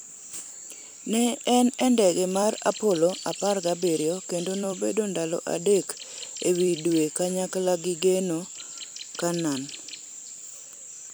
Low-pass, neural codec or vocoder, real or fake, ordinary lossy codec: none; vocoder, 44.1 kHz, 128 mel bands every 256 samples, BigVGAN v2; fake; none